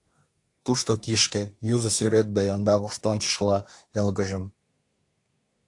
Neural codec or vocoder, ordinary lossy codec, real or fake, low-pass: codec, 24 kHz, 1 kbps, SNAC; AAC, 48 kbps; fake; 10.8 kHz